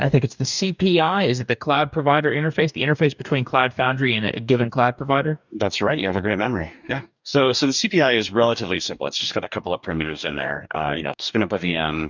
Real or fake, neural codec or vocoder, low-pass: fake; codec, 44.1 kHz, 2.6 kbps, DAC; 7.2 kHz